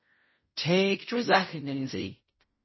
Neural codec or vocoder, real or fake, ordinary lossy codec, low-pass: codec, 16 kHz in and 24 kHz out, 0.4 kbps, LongCat-Audio-Codec, fine tuned four codebook decoder; fake; MP3, 24 kbps; 7.2 kHz